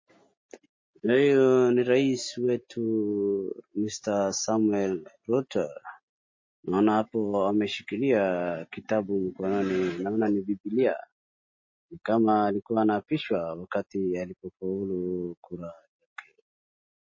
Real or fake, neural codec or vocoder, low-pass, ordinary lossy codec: real; none; 7.2 kHz; MP3, 32 kbps